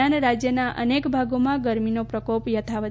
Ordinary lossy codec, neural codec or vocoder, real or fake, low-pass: none; none; real; none